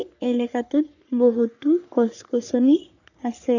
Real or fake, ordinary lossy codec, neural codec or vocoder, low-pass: fake; none; codec, 44.1 kHz, 3.4 kbps, Pupu-Codec; 7.2 kHz